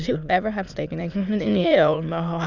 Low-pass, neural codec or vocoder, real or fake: 7.2 kHz; autoencoder, 22.05 kHz, a latent of 192 numbers a frame, VITS, trained on many speakers; fake